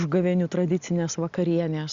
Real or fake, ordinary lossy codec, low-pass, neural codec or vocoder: real; Opus, 64 kbps; 7.2 kHz; none